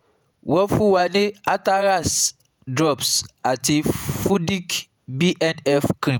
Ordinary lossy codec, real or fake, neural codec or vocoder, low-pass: none; fake; vocoder, 48 kHz, 128 mel bands, Vocos; none